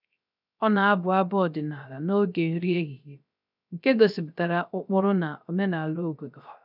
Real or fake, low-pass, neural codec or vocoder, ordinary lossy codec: fake; 5.4 kHz; codec, 16 kHz, 0.3 kbps, FocalCodec; none